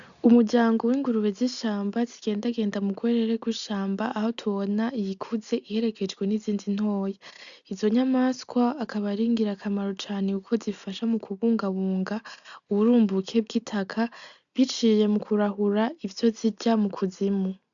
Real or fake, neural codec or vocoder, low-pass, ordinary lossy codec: real; none; 7.2 kHz; MP3, 96 kbps